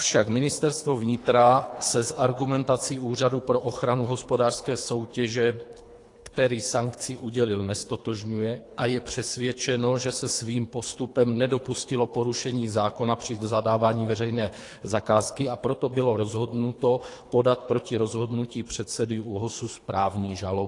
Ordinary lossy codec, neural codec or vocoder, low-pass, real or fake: AAC, 48 kbps; codec, 24 kHz, 3 kbps, HILCodec; 10.8 kHz; fake